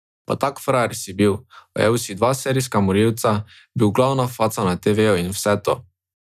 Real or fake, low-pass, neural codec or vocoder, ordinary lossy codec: real; 14.4 kHz; none; none